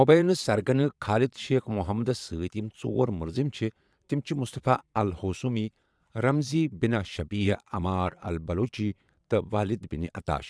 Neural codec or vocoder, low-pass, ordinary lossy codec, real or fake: vocoder, 22.05 kHz, 80 mel bands, Vocos; none; none; fake